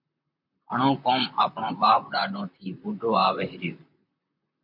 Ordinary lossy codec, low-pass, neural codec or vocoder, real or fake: MP3, 48 kbps; 5.4 kHz; vocoder, 44.1 kHz, 128 mel bands, Pupu-Vocoder; fake